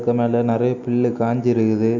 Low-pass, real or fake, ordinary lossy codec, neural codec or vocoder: 7.2 kHz; real; none; none